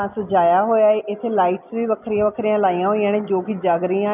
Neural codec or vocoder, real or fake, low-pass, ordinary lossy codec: none; real; 3.6 kHz; none